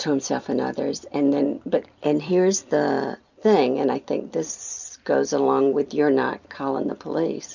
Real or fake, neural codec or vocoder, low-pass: fake; vocoder, 44.1 kHz, 128 mel bands every 256 samples, BigVGAN v2; 7.2 kHz